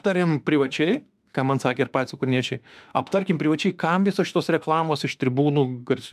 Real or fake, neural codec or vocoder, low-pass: fake; autoencoder, 48 kHz, 32 numbers a frame, DAC-VAE, trained on Japanese speech; 14.4 kHz